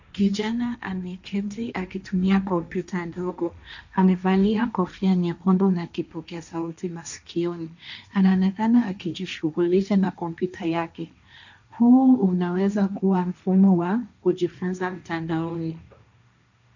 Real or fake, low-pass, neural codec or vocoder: fake; 7.2 kHz; codec, 16 kHz, 1.1 kbps, Voila-Tokenizer